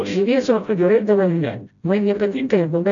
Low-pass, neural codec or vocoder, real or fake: 7.2 kHz; codec, 16 kHz, 0.5 kbps, FreqCodec, smaller model; fake